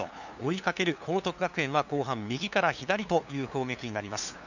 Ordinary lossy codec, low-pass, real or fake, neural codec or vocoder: none; 7.2 kHz; fake; codec, 16 kHz, 2 kbps, FunCodec, trained on LibriTTS, 25 frames a second